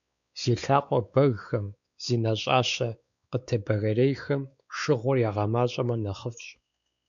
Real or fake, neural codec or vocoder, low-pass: fake; codec, 16 kHz, 4 kbps, X-Codec, WavLM features, trained on Multilingual LibriSpeech; 7.2 kHz